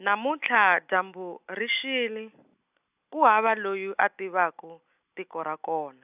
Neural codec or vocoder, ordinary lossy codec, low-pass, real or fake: none; AAC, 32 kbps; 3.6 kHz; real